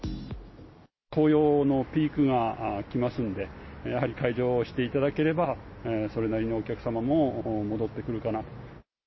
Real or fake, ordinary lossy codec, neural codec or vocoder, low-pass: real; MP3, 24 kbps; none; 7.2 kHz